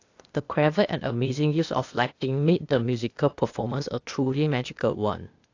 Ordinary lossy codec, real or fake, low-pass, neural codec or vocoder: AAC, 48 kbps; fake; 7.2 kHz; codec, 16 kHz, 0.8 kbps, ZipCodec